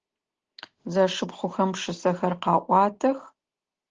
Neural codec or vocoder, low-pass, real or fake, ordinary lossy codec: none; 7.2 kHz; real; Opus, 16 kbps